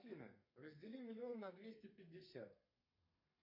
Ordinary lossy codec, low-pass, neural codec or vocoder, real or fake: MP3, 32 kbps; 5.4 kHz; codec, 32 kHz, 1.9 kbps, SNAC; fake